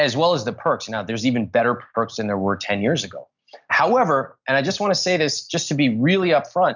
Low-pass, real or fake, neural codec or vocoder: 7.2 kHz; real; none